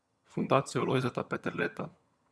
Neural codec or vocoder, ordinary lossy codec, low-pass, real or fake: vocoder, 22.05 kHz, 80 mel bands, HiFi-GAN; none; none; fake